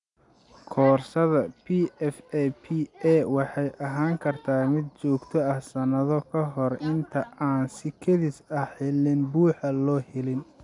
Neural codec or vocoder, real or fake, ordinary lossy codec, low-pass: none; real; none; 10.8 kHz